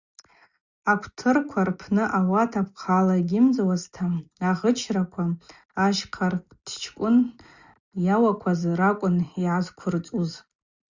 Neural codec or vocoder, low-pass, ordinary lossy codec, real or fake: none; 7.2 kHz; Opus, 64 kbps; real